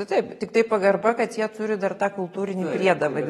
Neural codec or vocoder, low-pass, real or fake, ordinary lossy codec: none; 19.8 kHz; real; AAC, 32 kbps